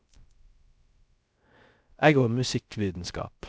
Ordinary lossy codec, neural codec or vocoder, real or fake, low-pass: none; codec, 16 kHz, 0.3 kbps, FocalCodec; fake; none